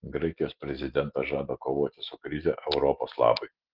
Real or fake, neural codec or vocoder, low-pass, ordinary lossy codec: real; none; 5.4 kHz; Opus, 16 kbps